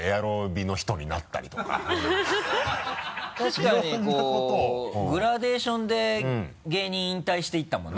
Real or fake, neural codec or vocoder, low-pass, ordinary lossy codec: real; none; none; none